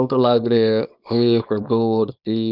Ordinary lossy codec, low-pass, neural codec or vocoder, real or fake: none; 5.4 kHz; codec, 24 kHz, 0.9 kbps, WavTokenizer, small release; fake